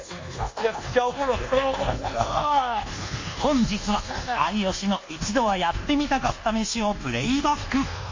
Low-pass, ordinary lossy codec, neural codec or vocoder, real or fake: 7.2 kHz; MP3, 48 kbps; codec, 24 kHz, 1.2 kbps, DualCodec; fake